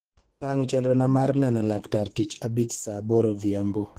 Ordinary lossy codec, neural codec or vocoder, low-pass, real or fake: Opus, 16 kbps; codec, 32 kHz, 1.9 kbps, SNAC; 14.4 kHz; fake